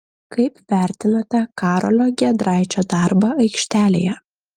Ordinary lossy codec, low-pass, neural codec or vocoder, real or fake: Opus, 32 kbps; 19.8 kHz; none; real